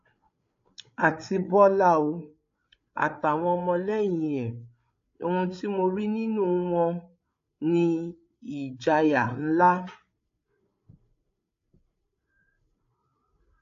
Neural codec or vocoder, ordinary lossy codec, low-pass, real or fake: codec, 16 kHz, 8 kbps, FreqCodec, larger model; AAC, 48 kbps; 7.2 kHz; fake